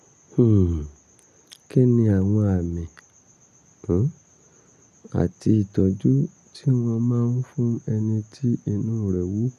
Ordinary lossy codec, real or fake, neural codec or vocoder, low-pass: none; real; none; 14.4 kHz